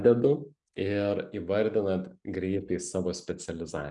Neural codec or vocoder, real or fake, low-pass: none; real; 10.8 kHz